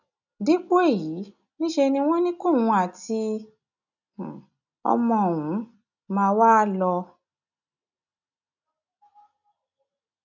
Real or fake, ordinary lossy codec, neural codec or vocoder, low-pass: real; none; none; 7.2 kHz